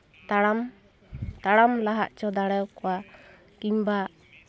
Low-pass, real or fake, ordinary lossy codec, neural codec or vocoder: none; real; none; none